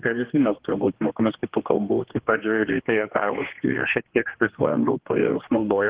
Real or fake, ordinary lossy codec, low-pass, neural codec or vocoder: fake; Opus, 16 kbps; 3.6 kHz; codec, 16 kHz, 2 kbps, X-Codec, HuBERT features, trained on general audio